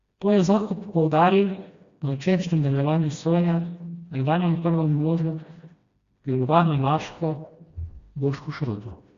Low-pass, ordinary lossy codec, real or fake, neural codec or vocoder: 7.2 kHz; Opus, 64 kbps; fake; codec, 16 kHz, 1 kbps, FreqCodec, smaller model